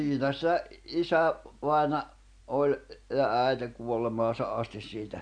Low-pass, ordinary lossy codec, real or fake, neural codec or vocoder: 9.9 kHz; none; real; none